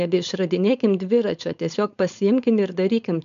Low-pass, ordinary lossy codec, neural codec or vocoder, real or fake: 7.2 kHz; MP3, 96 kbps; codec, 16 kHz, 4.8 kbps, FACodec; fake